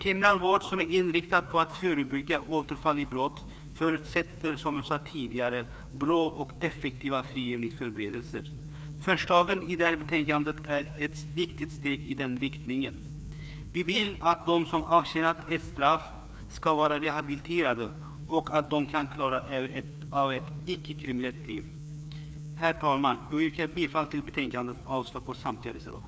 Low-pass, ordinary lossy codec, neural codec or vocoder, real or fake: none; none; codec, 16 kHz, 2 kbps, FreqCodec, larger model; fake